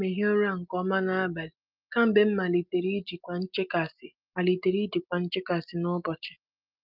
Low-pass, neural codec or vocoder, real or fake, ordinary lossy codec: 5.4 kHz; none; real; Opus, 24 kbps